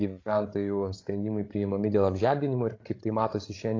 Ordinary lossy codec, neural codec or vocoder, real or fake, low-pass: AAC, 32 kbps; codec, 16 kHz, 16 kbps, FreqCodec, larger model; fake; 7.2 kHz